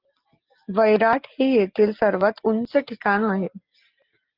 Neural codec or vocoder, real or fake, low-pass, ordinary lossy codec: none; real; 5.4 kHz; Opus, 32 kbps